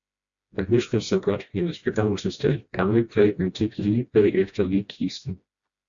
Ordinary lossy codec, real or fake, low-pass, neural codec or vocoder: Opus, 64 kbps; fake; 7.2 kHz; codec, 16 kHz, 1 kbps, FreqCodec, smaller model